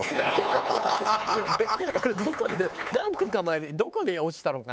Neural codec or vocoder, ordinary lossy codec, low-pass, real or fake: codec, 16 kHz, 4 kbps, X-Codec, HuBERT features, trained on LibriSpeech; none; none; fake